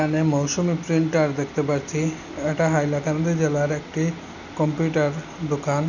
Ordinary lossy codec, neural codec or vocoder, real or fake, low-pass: none; none; real; 7.2 kHz